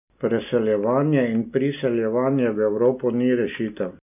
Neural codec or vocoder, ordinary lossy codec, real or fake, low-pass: none; none; real; 3.6 kHz